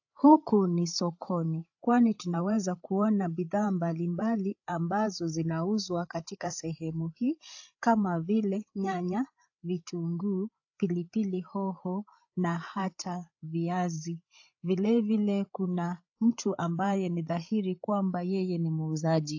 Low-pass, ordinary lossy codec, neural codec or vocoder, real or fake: 7.2 kHz; AAC, 48 kbps; codec, 16 kHz, 8 kbps, FreqCodec, larger model; fake